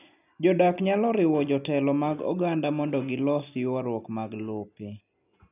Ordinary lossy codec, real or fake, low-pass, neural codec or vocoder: none; real; 3.6 kHz; none